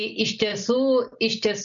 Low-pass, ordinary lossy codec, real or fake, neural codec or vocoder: 7.2 kHz; MP3, 96 kbps; real; none